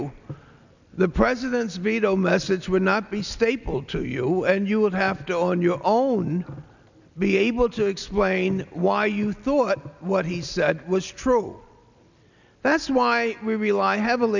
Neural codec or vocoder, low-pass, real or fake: none; 7.2 kHz; real